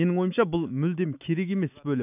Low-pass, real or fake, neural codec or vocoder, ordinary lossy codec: 3.6 kHz; fake; autoencoder, 48 kHz, 128 numbers a frame, DAC-VAE, trained on Japanese speech; none